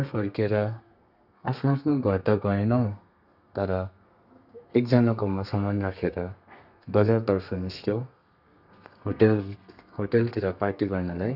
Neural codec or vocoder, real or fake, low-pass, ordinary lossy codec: codec, 32 kHz, 1.9 kbps, SNAC; fake; 5.4 kHz; none